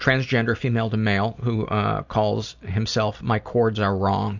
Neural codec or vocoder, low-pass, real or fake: none; 7.2 kHz; real